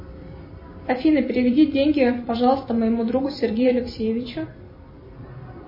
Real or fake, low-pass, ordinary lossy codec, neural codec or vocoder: fake; 5.4 kHz; MP3, 24 kbps; vocoder, 44.1 kHz, 128 mel bands every 256 samples, BigVGAN v2